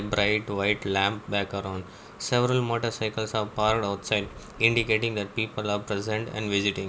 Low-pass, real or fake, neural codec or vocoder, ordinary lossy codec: none; real; none; none